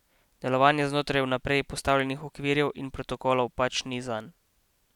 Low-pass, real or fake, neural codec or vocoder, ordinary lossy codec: 19.8 kHz; real; none; none